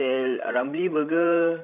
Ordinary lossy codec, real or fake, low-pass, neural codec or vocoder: none; fake; 3.6 kHz; codec, 16 kHz, 16 kbps, FreqCodec, larger model